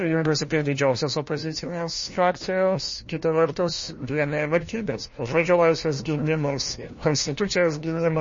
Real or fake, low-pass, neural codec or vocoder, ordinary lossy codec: fake; 7.2 kHz; codec, 16 kHz, 1 kbps, FunCodec, trained on LibriTTS, 50 frames a second; MP3, 32 kbps